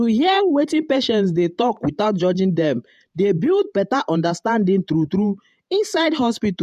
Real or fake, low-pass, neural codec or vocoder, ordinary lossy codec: fake; 14.4 kHz; vocoder, 48 kHz, 128 mel bands, Vocos; MP3, 96 kbps